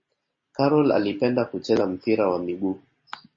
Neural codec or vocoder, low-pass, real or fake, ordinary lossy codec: none; 7.2 kHz; real; MP3, 32 kbps